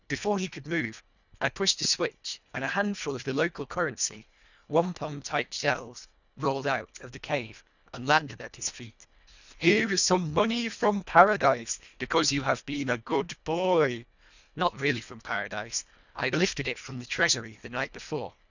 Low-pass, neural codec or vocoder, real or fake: 7.2 kHz; codec, 24 kHz, 1.5 kbps, HILCodec; fake